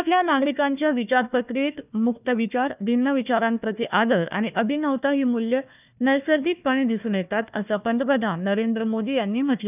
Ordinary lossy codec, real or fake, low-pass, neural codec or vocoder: none; fake; 3.6 kHz; codec, 16 kHz, 1 kbps, FunCodec, trained on Chinese and English, 50 frames a second